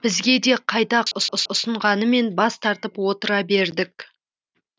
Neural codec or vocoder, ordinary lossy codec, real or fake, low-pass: none; none; real; none